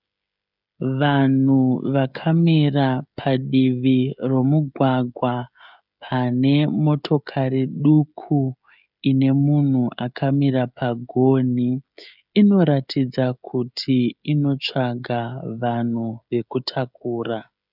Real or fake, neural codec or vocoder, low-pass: fake; codec, 16 kHz, 16 kbps, FreqCodec, smaller model; 5.4 kHz